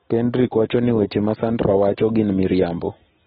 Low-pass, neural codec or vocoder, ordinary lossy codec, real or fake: 19.8 kHz; none; AAC, 16 kbps; real